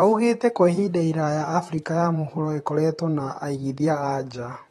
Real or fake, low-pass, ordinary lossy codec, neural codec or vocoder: fake; 19.8 kHz; AAC, 32 kbps; vocoder, 44.1 kHz, 128 mel bands, Pupu-Vocoder